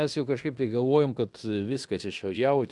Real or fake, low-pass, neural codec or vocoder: fake; 10.8 kHz; codec, 16 kHz in and 24 kHz out, 0.9 kbps, LongCat-Audio-Codec, fine tuned four codebook decoder